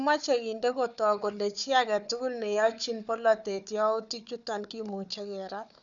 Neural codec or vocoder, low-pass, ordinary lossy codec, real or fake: codec, 16 kHz, 4 kbps, FunCodec, trained on Chinese and English, 50 frames a second; 7.2 kHz; none; fake